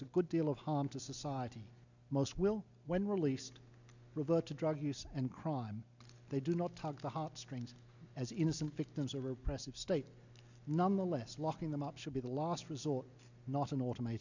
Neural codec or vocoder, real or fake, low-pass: none; real; 7.2 kHz